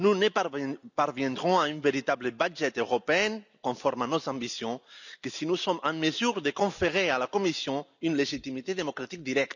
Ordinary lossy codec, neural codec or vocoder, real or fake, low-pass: none; none; real; 7.2 kHz